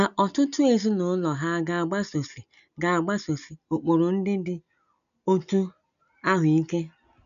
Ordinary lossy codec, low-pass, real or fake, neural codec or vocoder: none; 7.2 kHz; real; none